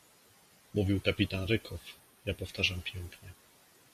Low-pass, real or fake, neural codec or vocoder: 14.4 kHz; real; none